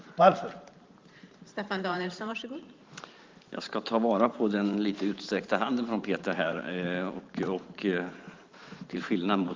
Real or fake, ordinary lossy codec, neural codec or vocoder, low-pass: fake; Opus, 32 kbps; vocoder, 44.1 kHz, 128 mel bands every 512 samples, BigVGAN v2; 7.2 kHz